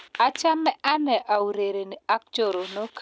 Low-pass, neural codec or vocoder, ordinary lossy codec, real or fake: none; none; none; real